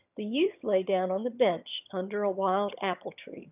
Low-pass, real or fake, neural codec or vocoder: 3.6 kHz; fake; vocoder, 22.05 kHz, 80 mel bands, HiFi-GAN